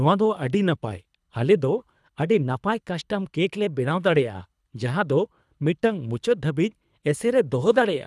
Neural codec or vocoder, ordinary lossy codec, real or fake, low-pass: codec, 24 kHz, 3 kbps, HILCodec; none; fake; none